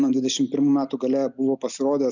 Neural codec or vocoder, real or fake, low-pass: none; real; 7.2 kHz